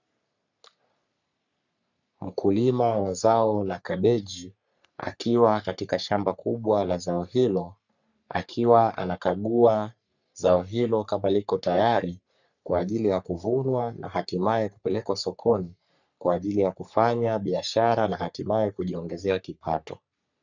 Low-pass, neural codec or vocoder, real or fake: 7.2 kHz; codec, 44.1 kHz, 3.4 kbps, Pupu-Codec; fake